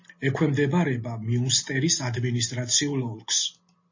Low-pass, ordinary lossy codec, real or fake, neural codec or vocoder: 7.2 kHz; MP3, 32 kbps; real; none